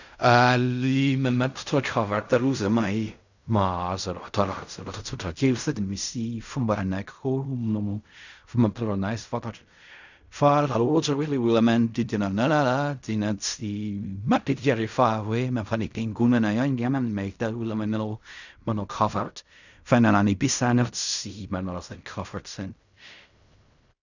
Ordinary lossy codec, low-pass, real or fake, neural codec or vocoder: none; 7.2 kHz; fake; codec, 16 kHz in and 24 kHz out, 0.4 kbps, LongCat-Audio-Codec, fine tuned four codebook decoder